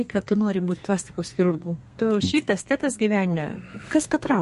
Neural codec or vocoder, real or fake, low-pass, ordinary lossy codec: codec, 32 kHz, 1.9 kbps, SNAC; fake; 14.4 kHz; MP3, 48 kbps